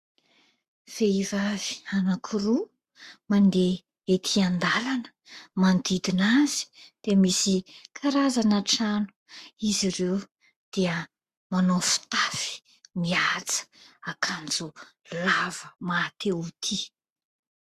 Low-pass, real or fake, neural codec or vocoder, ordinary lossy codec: 14.4 kHz; fake; codec, 44.1 kHz, 7.8 kbps, Pupu-Codec; Opus, 64 kbps